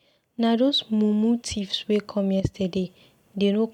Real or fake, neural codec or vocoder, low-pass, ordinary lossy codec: real; none; 19.8 kHz; none